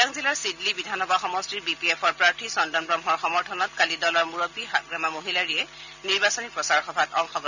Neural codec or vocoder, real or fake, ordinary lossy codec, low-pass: none; real; none; 7.2 kHz